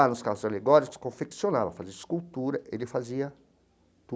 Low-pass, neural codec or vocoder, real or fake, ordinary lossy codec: none; none; real; none